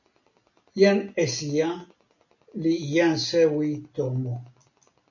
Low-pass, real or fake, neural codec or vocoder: 7.2 kHz; real; none